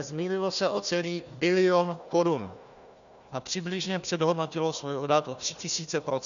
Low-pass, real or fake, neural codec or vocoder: 7.2 kHz; fake; codec, 16 kHz, 1 kbps, FunCodec, trained on Chinese and English, 50 frames a second